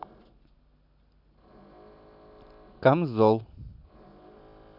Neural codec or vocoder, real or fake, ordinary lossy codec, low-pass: none; real; none; 5.4 kHz